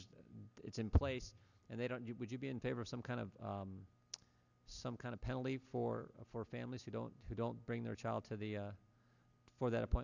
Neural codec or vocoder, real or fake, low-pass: none; real; 7.2 kHz